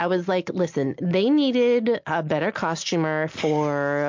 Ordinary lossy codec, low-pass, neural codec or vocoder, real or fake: MP3, 48 kbps; 7.2 kHz; none; real